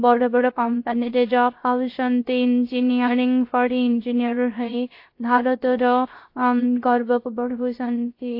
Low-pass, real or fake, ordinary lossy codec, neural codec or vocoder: 5.4 kHz; fake; AAC, 32 kbps; codec, 16 kHz, 0.3 kbps, FocalCodec